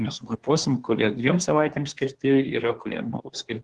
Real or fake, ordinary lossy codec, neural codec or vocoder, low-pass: fake; Opus, 16 kbps; codec, 24 kHz, 1 kbps, SNAC; 10.8 kHz